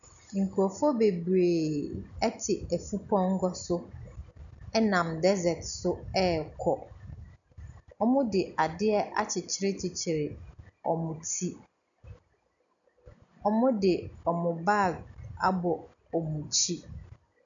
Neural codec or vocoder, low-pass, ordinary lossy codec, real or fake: none; 7.2 kHz; MP3, 96 kbps; real